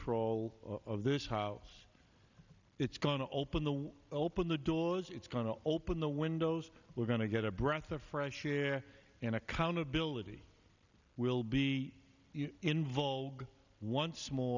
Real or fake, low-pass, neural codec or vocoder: real; 7.2 kHz; none